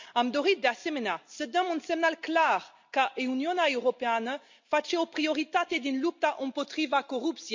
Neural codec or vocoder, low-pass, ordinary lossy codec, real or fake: none; 7.2 kHz; MP3, 48 kbps; real